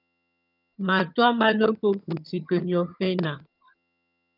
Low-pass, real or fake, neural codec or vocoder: 5.4 kHz; fake; vocoder, 22.05 kHz, 80 mel bands, HiFi-GAN